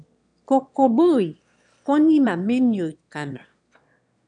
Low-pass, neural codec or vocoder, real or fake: 9.9 kHz; autoencoder, 22.05 kHz, a latent of 192 numbers a frame, VITS, trained on one speaker; fake